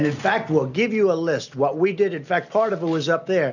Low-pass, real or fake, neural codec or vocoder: 7.2 kHz; real; none